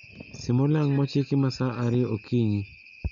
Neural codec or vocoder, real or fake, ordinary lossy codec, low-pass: none; real; none; 7.2 kHz